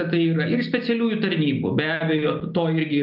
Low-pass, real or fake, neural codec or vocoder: 5.4 kHz; real; none